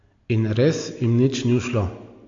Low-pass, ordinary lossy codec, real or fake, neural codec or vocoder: 7.2 kHz; AAC, 48 kbps; real; none